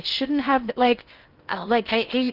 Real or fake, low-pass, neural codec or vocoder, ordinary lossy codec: fake; 5.4 kHz; codec, 16 kHz in and 24 kHz out, 0.6 kbps, FocalCodec, streaming, 4096 codes; Opus, 32 kbps